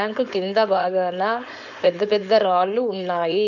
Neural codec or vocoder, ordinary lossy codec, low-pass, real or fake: codec, 16 kHz, 4.8 kbps, FACodec; none; 7.2 kHz; fake